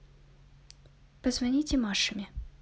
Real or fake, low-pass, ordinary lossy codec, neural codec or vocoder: real; none; none; none